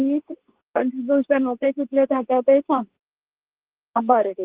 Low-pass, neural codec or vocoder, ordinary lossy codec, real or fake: 3.6 kHz; codec, 24 kHz, 0.9 kbps, WavTokenizer, medium speech release version 2; Opus, 16 kbps; fake